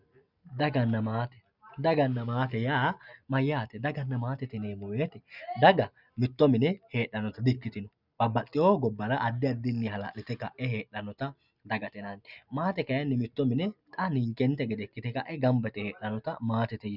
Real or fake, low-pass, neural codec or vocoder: real; 5.4 kHz; none